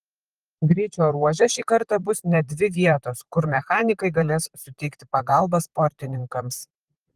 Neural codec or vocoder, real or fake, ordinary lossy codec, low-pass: vocoder, 44.1 kHz, 128 mel bands, Pupu-Vocoder; fake; Opus, 24 kbps; 14.4 kHz